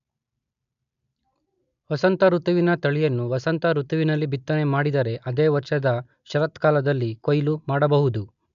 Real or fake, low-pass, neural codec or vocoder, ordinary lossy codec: real; 7.2 kHz; none; AAC, 96 kbps